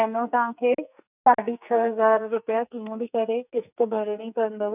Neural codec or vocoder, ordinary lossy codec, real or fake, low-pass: codec, 44.1 kHz, 2.6 kbps, SNAC; none; fake; 3.6 kHz